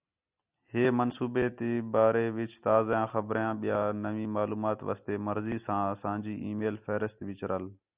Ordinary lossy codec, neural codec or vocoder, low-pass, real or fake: Opus, 64 kbps; none; 3.6 kHz; real